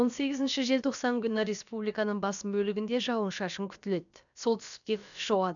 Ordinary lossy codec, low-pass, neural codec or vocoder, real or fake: none; 7.2 kHz; codec, 16 kHz, about 1 kbps, DyCAST, with the encoder's durations; fake